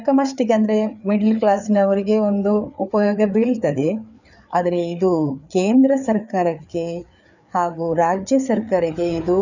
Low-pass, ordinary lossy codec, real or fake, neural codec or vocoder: 7.2 kHz; none; fake; codec, 16 kHz, 4 kbps, FreqCodec, larger model